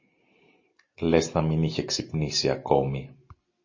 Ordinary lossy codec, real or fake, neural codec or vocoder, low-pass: MP3, 32 kbps; real; none; 7.2 kHz